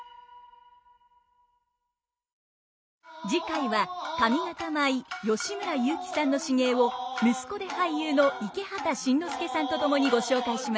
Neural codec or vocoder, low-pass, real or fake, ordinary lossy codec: none; none; real; none